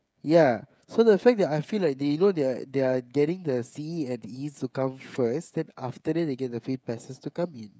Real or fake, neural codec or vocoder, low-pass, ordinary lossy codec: fake; codec, 16 kHz, 16 kbps, FreqCodec, smaller model; none; none